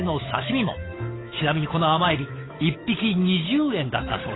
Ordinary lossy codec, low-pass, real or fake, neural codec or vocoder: AAC, 16 kbps; 7.2 kHz; fake; codec, 16 kHz, 8 kbps, FunCodec, trained on Chinese and English, 25 frames a second